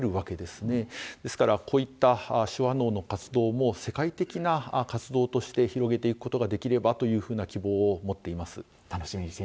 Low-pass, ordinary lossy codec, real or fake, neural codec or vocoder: none; none; real; none